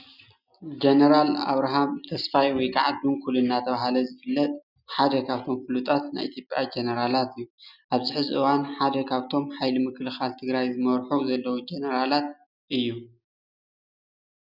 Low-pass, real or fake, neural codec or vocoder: 5.4 kHz; real; none